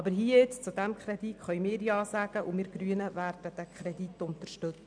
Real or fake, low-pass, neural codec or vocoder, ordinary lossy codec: real; 9.9 kHz; none; none